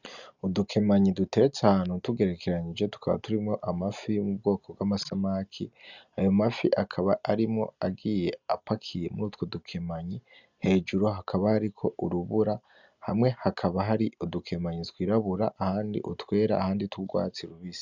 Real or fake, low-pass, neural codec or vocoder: real; 7.2 kHz; none